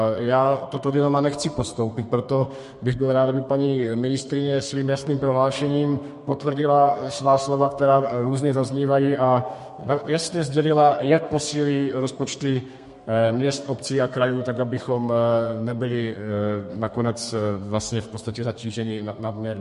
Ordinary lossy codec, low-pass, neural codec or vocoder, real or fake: MP3, 48 kbps; 14.4 kHz; codec, 32 kHz, 1.9 kbps, SNAC; fake